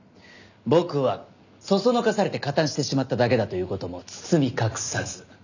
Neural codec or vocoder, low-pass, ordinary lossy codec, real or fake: none; 7.2 kHz; none; real